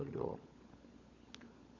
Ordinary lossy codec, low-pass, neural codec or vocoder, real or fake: Opus, 64 kbps; 7.2 kHz; codec, 16 kHz, 16 kbps, FunCodec, trained on LibriTTS, 50 frames a second; fake